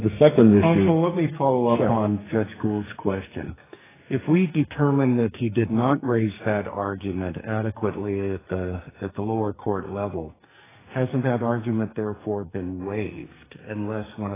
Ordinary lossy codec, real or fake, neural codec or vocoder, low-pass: AAC, 16 kbps; fake; codec, 44.1 kHz, 2.6 kbps, SNAC; 3.6 kHz